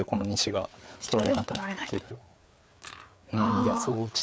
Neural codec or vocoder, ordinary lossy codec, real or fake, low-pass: codec, 16 kHz, 4 kbps, FreqCodec, larger model; none; fake; none